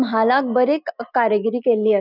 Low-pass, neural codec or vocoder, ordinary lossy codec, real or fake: 5.4 kHz; none; none; real